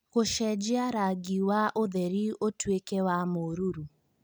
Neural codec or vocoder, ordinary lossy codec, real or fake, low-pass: none; none; real; none